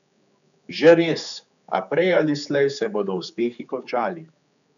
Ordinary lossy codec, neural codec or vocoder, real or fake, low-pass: none; codec, 16 kHz, 4 kbps, X-Codec, HuBERT features, trained on general audio; fake; 7.2 kHz